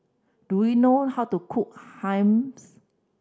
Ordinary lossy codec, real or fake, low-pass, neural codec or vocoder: none; real; none; none